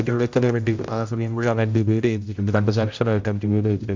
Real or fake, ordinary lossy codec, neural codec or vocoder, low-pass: fake; MP3, 64 kbps; codec, 16 kHz, 0.5 kbps, X-Codec, HuBERT features, trained on general audio; 7.2 kHz